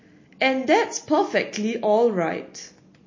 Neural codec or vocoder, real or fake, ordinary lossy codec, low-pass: none; real; MP3, 32 kbps; 7.2 kHz